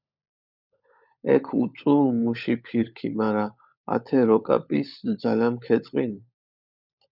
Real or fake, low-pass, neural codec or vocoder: fake; 5.4 kHz; codec, 16 kHz, 16 kbps, FunCodec, trained on LibriTTS, 50 frames a second